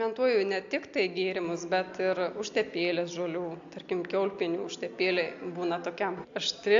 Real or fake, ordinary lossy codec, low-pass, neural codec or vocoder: real; AAC, 64 kbps; 7.2 kHz; none